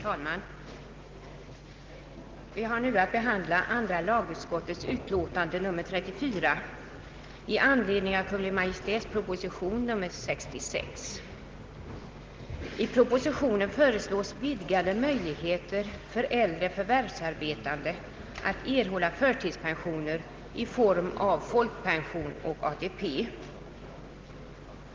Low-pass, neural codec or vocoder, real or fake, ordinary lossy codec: 7.2 kHz; none; real; Opus, 16 kbps